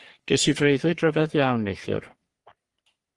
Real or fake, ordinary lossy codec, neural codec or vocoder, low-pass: fake; Opus, 24 kbps; codec, 44.1 kHz, 3.4 kbps, Pupu-Codec; 10.8 kHz